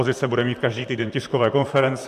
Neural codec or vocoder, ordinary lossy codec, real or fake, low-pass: vocoder, 44.1 kHz, 128 mel bands, Pupu-Vocoder; MP3, 96 kbps; fake; 14.4 kHz